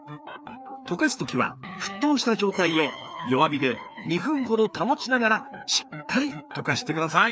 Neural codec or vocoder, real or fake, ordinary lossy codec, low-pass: codec, 16 kHz, 2 kbps, FreqCodec, larger model; fake; none; none